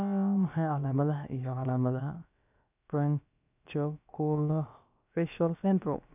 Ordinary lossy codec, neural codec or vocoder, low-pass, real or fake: none; codec, 16 kHz, about 1 kbps, DyCAST, with the encoder's durations; 3.6 kHz; fake